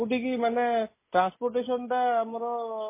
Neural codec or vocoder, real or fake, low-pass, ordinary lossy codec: none; real; 3.6 kHz; MP3, 16 kbps